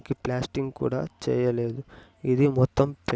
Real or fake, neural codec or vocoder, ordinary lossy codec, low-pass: real; none; none; none